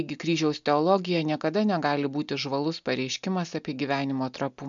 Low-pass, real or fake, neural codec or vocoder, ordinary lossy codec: 7.2 kHz; real; none; MP3, 64 kbps